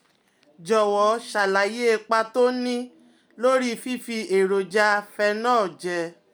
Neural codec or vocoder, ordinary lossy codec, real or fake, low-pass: none; none; real; none